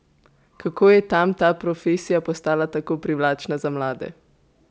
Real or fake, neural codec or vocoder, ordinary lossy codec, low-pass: real; none; none; none